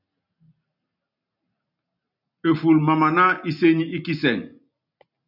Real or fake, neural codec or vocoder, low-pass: real; none; 5.4 kHz